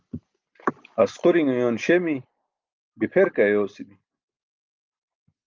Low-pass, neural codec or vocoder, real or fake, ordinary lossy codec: 7.2 kHz; none; real; Opus, 32 kbps